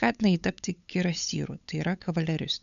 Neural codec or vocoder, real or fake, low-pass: codec, 16 kHz, 8 kbps, FunCodec, trained on LibriTTS, 25 frames a second; fake; 7.2 kHz